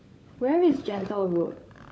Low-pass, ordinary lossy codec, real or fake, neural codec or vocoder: none; none; fake; codec, 16 kHz, 16 kbps, FunCodec, trained on LibriTTS, 50 frames a second